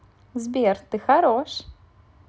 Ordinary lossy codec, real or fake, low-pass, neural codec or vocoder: none; real; none; none